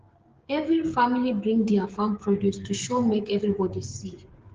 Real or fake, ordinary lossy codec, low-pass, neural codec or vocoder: fake; Opus, 16 kbps; 7.2 kHz; codec, 16 kHz, 16 kbps, FreqCodec, smaller model